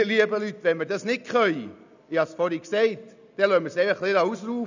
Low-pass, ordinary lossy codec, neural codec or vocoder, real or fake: 7.2 kHz; none; none; real